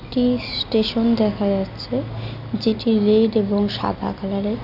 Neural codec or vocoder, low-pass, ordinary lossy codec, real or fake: none; 5.4 kHz; none; real